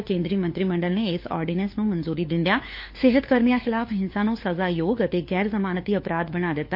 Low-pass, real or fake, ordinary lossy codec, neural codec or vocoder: 5.4 kHz; fake; MP3, 32 kbps; codec, 16 kHz, 4 kbps, FunCodec, trained on LibriTTS, 50 frames a second